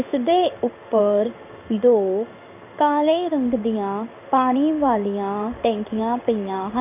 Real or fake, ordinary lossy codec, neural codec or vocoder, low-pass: fake; none; codec, 16 kHz in and 24 kHz out, 1 kbps, XY-Tokenizer; 3.6 kHz